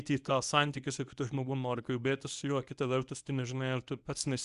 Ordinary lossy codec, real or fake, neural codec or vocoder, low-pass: Opus, 64 kbps; fake; codec, 24 kHz, 0.9 kbps, WavTokenizer, medium speech release version 1; 10.8 kHz